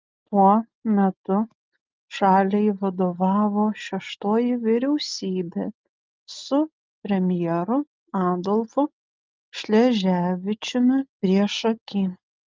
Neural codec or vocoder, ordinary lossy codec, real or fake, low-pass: none; Opus, 24 kbps; real; 7.2 kHz